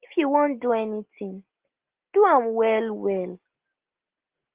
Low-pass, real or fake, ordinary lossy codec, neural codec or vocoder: 3.6 kHz; real; Opus, 16 kbps; none